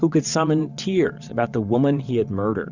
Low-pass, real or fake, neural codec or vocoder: 7.2 kHz; fake; vocoder, 22.05 kHz, 80 mel bands, WaveNeXt